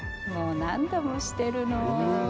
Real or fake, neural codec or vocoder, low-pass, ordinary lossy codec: real; none; none; none